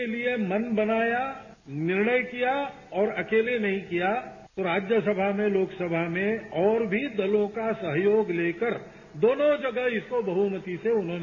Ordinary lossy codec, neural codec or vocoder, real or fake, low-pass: MP3, 32 kbps; none; real; 7.2 kHz